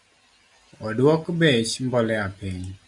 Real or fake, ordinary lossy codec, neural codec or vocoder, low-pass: real; Opus, 64 kbps; none; 10.8 kHz